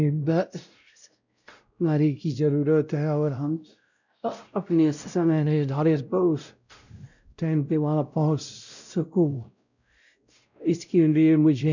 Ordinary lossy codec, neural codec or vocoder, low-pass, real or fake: none; codec, 16 kHz, 0.5 kbps, X-Codec, WavLM features, trained on Multilingual LibriSpeech; 7.2 kHz; fake